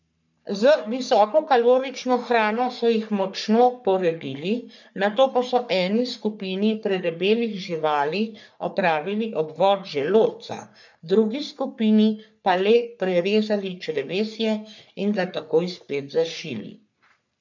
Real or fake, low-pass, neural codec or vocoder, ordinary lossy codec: fake; 7.2 kHz; codec, 44.1 kHz, 3.4 kbps, Pupu-Codec; none